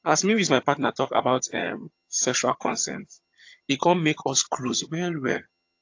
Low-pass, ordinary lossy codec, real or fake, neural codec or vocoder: 7.2 kHz; AAC, 48 kbps; fake; vocoder, 22.05 kHz, 80 mel bands, HiFi-GAN